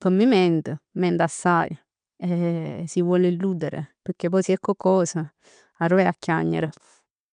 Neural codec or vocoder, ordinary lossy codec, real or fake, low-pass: none; MP3, 96 kbps; real; 9.9 kHz